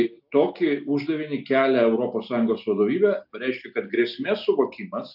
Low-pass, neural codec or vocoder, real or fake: 5.4 kHz; none; real